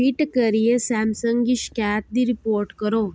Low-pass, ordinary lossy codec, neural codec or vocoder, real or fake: none; none; none; real